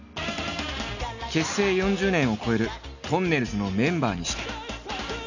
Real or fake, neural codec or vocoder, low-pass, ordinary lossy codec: real; none; 7.2 kHz; none